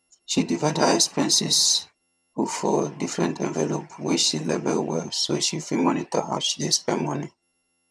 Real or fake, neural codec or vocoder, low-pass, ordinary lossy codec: fake; vocoder, 22.05 kHz, 80 mel bands, HiFi-GAN; none; none